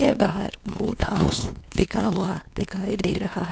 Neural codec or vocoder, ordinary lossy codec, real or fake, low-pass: codec, 16 kHz, 2 kbps, X-Codec, WavLM features, trained on Multilingual LibriSpeech; none; fake; none